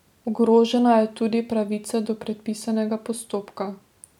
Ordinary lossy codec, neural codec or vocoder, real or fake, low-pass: none; none; real; 19.8 kHz